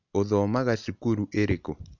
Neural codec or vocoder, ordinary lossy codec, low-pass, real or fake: none; none; 7.2 kHz; real